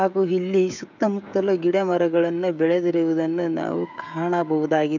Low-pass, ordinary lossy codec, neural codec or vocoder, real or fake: 7.2 kHz; none; codec, 16 kHz, 16 kbps, FreqCodec, smaller model; fake